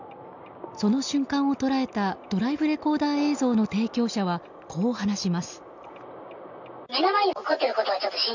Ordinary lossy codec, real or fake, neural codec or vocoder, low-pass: none; real; none; 7.2 kHz